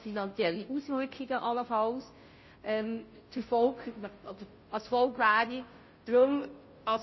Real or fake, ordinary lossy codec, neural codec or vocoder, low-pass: fake; MP3, 24 kbps; codec, 16 kHz, 0.5 kbps, FunCodec, trained on Chinese and English, 25 frames a second; 7.2 kHz